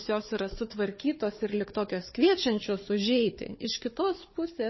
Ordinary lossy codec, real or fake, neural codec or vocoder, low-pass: MP3, 24 kbps; fake; codec, 16 kHz, 4 kbps, FunCodec, trained on Chinese and English, 50 frames a second; 7.2 kHz